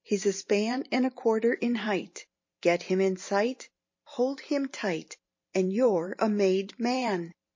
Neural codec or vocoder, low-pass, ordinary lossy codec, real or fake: vocoder, 44.1 kHz, 128 mel bands every 512 samples, BigVGAN v2; 7.2 kHz; MP3, 32 kbps; fake